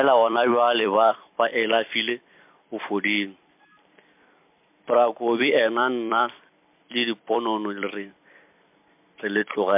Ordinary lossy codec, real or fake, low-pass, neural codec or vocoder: MP3, 32 kbps; fake; 3.6 kHz; vocoder, 44.1 kHz, 128 mel bands every 256 samples, BigVGAN v2